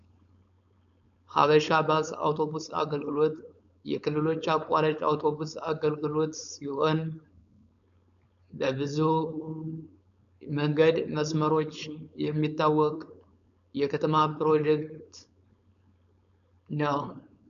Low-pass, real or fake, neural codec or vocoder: 7.2 kHz; fake; codec, 16 kHz, 4.8 kbps, FACodec